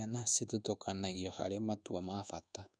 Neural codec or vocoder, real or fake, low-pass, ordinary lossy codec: codec, 24 kHz, 1.2 kbps, DualCodec; fake; 9.9 kHz; none